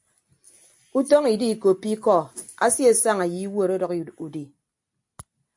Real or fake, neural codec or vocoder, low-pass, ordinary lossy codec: real; none; 10.8 kHz; AAC, 64 kbps